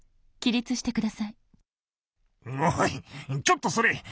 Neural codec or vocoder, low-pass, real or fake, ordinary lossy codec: none; none; real; none